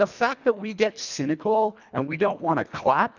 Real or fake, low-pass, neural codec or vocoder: fake; 7.2 kHz; codec, 24 kHz, 1.5 kbps, HILCodec